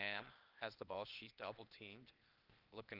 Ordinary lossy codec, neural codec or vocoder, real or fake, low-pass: Opus, 32 kbps; codec, 16 kHz, 0.8 kbps, ZipCodec; fake; 5.4 kHz